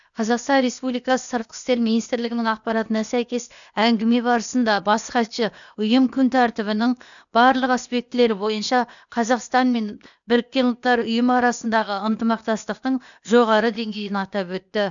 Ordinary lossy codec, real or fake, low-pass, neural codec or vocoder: none; fake; 7.2 kHz; codec, 16 kHz, 0.8 kbps, ZipCodec